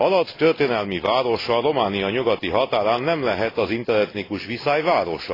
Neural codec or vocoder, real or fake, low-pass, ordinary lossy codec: none; real; 5.4 kHz; AAC, 24 kbps